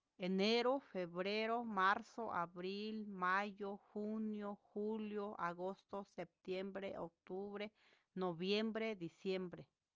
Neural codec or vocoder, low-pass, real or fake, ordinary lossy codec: none; 7.2 kHz; real; Opus, 24 kbps